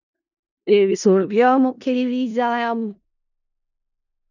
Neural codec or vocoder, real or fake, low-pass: codec, 16 kHz in and 24 kHz out, 0.4 kbps, LongCat-Audio-Codec, four codebook decoder; fake; 7.2 kHz